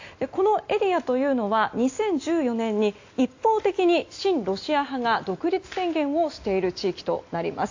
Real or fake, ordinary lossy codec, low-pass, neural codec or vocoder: real; AAC, 48 kbps; 7.2 kHz; none